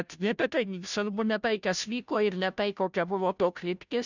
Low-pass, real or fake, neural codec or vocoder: 7.2 kHz; fake; codec, 16 kHz, 0.5 kbps, FunCodec, trained on Chinese and English, 25 frames a second